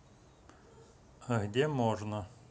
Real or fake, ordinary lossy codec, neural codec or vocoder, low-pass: real; none; none; none